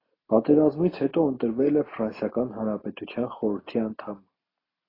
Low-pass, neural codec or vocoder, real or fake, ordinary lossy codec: 5.4 kHz; none; real; AAC, 24 kbps